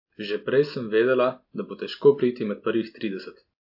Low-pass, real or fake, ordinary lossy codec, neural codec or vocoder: 5.4 kHz; real; AAC, 48 kbps; none